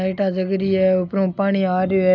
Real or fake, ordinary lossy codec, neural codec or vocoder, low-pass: real; none; none; 7.2 kHz